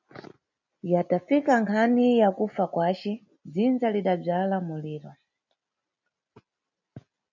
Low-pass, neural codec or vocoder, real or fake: 7.2 kHz; none; real